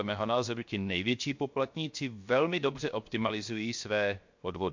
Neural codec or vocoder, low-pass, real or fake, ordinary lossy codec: codec, 16 kHz, 0.7 kbps, FocalCodec; 7.2 kHz; fake; MP3, 48 kbps